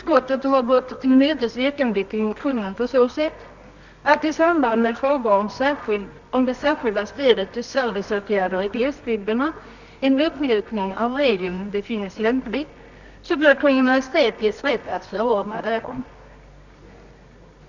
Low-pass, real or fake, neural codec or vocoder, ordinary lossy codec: 7.2 kHz; fake; codec, 24 kHz, 0.9 kbps, WavTokenizer, medium music audio release; none